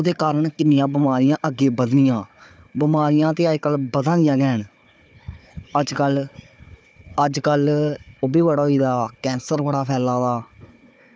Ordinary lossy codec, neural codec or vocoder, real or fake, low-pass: none; codec, 16 kHz, 4 kbps, FunCodec, trained on Chinese and English, 50 frames a second; fake; none